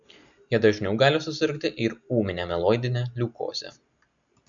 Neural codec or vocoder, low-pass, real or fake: none; 7.2 kHz; real